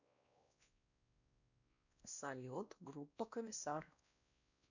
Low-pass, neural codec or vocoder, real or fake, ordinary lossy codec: 7.2 kHz; codec, 16 kHz, 1 kbps, X-Codec, WavLM features, trained on Multilingual LibriSpeech; fake; none